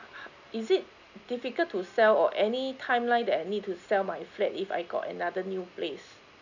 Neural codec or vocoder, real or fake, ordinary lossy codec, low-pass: none; real; none; 7.2 kHz